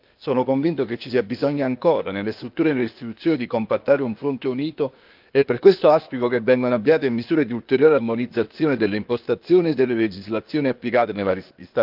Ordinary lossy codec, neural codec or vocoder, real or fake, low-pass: Opus, 24 kbps; codec, 16 kHz, 0.8 kbps, ZipCodec; fake; 5.4 kHz